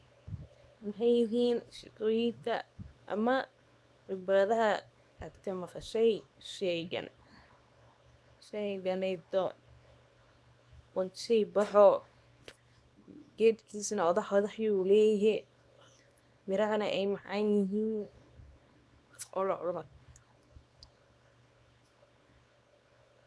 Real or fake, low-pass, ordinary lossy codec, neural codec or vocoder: fake; none; none; codec, 24 kHz, 0.9 kbps, WavTokenizer, small release